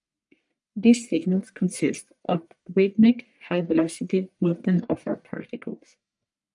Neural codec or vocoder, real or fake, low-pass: codec, 44.1 kHz, 1.7 kbps, Pupu-Codec; fake; 10.8 kHz